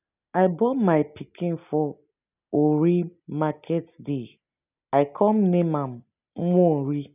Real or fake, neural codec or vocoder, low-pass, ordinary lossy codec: real; none; 3.6 kHz; none